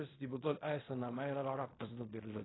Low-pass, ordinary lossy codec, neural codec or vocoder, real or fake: 7.2 kHz; AAC, 16 kbps; codec, 16 kHz in and 24 kHz out, 0.4 kbps, LongCat-Audio-Codec, fine tuned four codebook decoder; fake